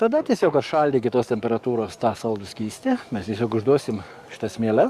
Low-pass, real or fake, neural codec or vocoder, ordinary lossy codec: 14.4 kHz; fake; codec, 44.1 kHz, 7.8 kbps, DAC; Opus, 64 kbps